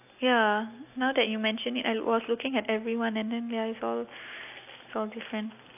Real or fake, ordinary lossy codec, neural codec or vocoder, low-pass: real; AAC, 32 kbps; none; 3.6 kHz